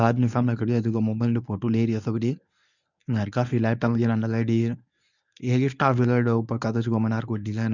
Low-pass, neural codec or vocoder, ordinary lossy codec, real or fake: 7.2 kHz; codec, 24 kHz, 0.9 kbps, WavTokenizer, medium speech release version 1; none; fake